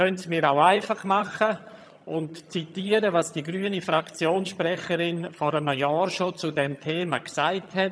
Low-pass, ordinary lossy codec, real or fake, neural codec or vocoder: none; none; fake; vocoder, 22.05 kHz, 80 mel bands, HiFi-GAN